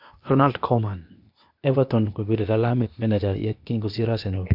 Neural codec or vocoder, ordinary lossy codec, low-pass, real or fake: codec, 16 kHz, 0.8 kbps, ZipCodec; MP3, 48 kbps; 5.4 kHz; fake